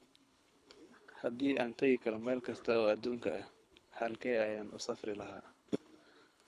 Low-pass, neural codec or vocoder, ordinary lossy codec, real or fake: none; codec, 24 kHz, 3 kbps, HILCodec; none; fake